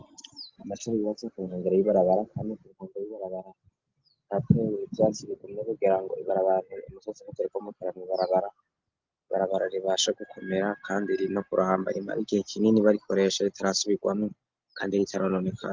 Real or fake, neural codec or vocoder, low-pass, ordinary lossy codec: real; none; 7.2 kHz; Opus, 24 kbps